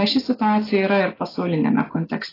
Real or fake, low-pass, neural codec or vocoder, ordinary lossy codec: real; 5.4 kHz; none; AAC, 24 kbps